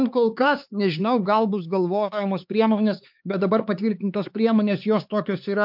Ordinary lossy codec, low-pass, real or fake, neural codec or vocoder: MP3, 48 kbps; 5.4 kHz; fake; codec, 16 kHz, 4 kbps, X-Codec, HuBERT features, trained on LibriSpeech